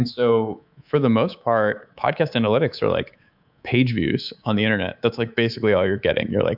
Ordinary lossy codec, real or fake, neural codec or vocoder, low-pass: AAC, 48 kbps; fake; codec, 24 kHz, 3.1 kbps, DualCodec; 5.4 kHz